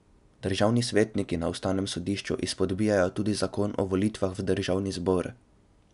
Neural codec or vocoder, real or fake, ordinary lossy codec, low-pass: none; real; none; 10.8 kHz